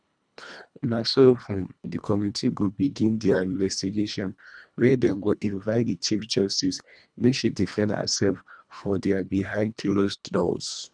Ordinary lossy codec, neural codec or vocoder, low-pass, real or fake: none; codec, 24 kHz, 1.5 kbps, HILCodec; 9.9 kHz; fake